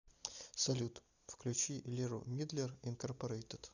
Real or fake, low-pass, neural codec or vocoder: real; 7.2 kHz; none